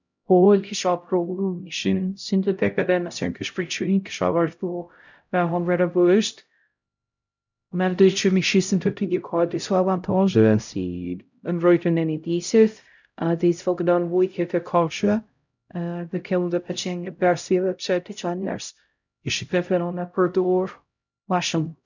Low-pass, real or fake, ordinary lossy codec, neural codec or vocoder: 7.2 kHz; fake; none; codec, 16 kHz, 0.5 kbps, X-Codec, HuBERT features, trained on LibriSpeech